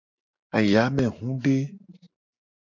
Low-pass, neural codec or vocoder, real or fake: 7.2 kHz; none; real